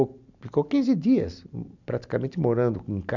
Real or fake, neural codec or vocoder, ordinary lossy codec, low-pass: real; none; none; 7.2 kHz